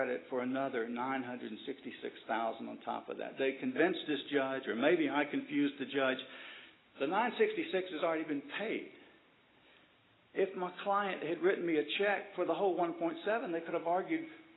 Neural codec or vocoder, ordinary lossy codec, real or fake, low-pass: none; AAC, 16 kbps; real; 7.2 kHz